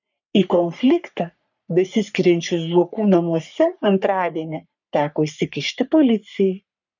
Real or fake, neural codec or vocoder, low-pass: fake; codec, 44.1 kHz, 3.4 kbps, Pupu-Codec; 7.2 kHz